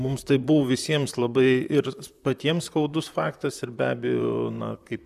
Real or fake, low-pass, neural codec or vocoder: fake; 14.4 kHz; vocoder, 44.1 kHz, 128 mel bands, Pupu-Vocoder